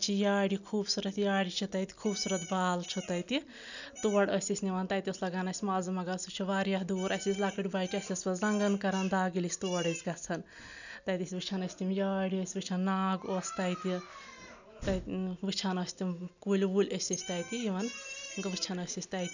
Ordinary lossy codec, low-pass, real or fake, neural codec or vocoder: none; 7.2 kHz; real; none